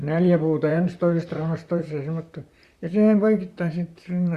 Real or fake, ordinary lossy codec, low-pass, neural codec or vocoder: fake; Opus, 64 kbps; 14.4 kHz; vocoder, 44.1 kHz, 128 mel bands, Pupu-Vocoder